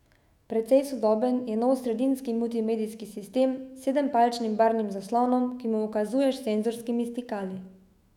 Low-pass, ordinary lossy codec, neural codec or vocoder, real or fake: 19.8 kHz; none; autoencoder, 48 kHz, 128 numbers a frame, DAC-VAE, trained on Japanese speech; fake